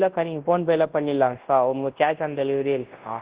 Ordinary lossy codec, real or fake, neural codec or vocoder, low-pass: Opus, 16 kbps; fake; codec, 24 kHz, 0.9 kbps, WavTokenizer, large speech release; 3.6 kHz